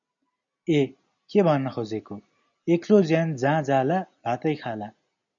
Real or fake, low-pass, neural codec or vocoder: real; 7.2 kHz; none